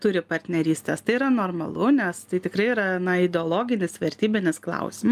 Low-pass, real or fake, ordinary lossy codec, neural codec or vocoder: 14.4 kHz; real; Opus, 32 kbps; none